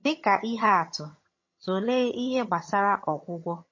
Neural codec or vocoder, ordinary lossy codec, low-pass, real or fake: vocoder, 22.05 kHz, 80 mel bands, HiFi-GAN; MP3, 32 kbps; 7.2 kHz; fake